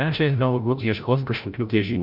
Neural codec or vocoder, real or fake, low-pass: codec, 16 kHz, 0.5 kbps, FreqCodec, larger model; fake; 5.4 kHz